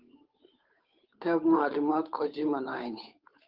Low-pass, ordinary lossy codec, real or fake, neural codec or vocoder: 5.4 kHz; Opus, 24 kbps; fake; codec, 16 kHz, 8 kbps, FunCodec, trained on Chinese and English, 25 frames a second